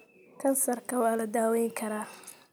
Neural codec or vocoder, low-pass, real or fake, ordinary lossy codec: none; none; real; none